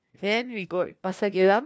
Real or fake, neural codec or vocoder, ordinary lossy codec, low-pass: fake; codec, 16 kHz, 1 kbps, FunCodec, trained on LibriTTS, 50 frames a second; none; none